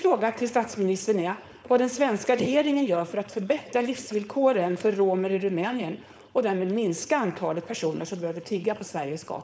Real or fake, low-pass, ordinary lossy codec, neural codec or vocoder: fake; none; none; codec, 16 kHz, 4.8 kbps, FACodec